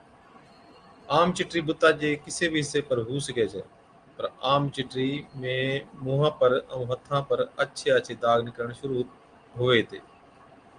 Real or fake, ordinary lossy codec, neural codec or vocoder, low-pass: real; Opus, 24 kbps; none; 9.9 kHz